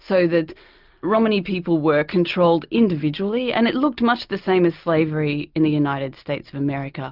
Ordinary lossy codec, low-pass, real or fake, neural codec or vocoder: Opus, 24 kbps; 5.4 kHz; real; none